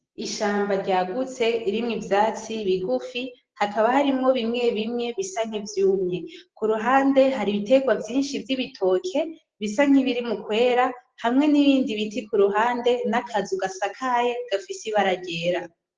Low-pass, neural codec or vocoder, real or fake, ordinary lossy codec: 7.2 kHz; none; real; Opus, 16 kbps